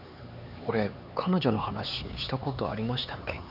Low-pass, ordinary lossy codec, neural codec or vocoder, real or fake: 5.4 kHz; none; codec, 16 kHz, 4 kbps, X-Codec, HuBERT features, trained on LibriSpeech; fake